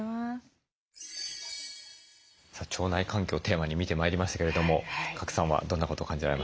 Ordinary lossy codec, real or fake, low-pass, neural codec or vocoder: none; real; none; none